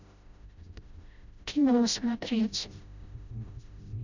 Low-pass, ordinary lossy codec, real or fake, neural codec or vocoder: 7.2 kHz; none; fake; codec, 16 kHz, 0.5 kbps, FreqCodec, smaller model